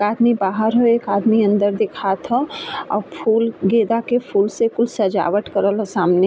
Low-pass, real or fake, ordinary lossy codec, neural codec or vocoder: none; real; none; none